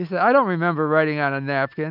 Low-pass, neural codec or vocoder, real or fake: 5.4 kHz; none; real